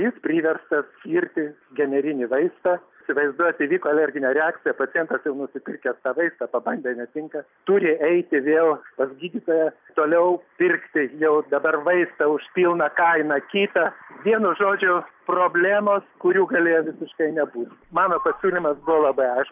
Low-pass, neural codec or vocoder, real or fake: 3.6 kHz; none; real